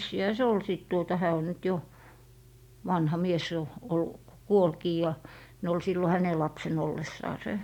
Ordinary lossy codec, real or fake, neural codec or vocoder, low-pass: none; real; none; 19.8 kHz